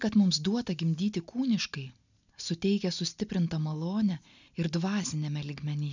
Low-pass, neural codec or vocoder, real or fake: 7.2 kHz; none; real